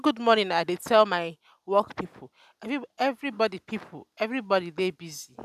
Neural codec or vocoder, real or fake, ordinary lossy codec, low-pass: none; real; none; 14.4 kHz